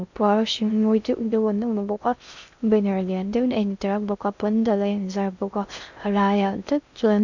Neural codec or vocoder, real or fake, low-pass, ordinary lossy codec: codec, 16 kHz in and 24 kHz out, 0.6 kbps, FocalCodec, streaming, 2048 codes; fake; 7.2 kHz; none